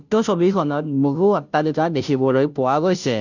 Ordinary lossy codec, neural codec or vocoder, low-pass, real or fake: none; codec, 16 kHz, 0.5 kbps, FunCodec, trained on Chinese and English, 25 frames a second; 7.2 kHz; fake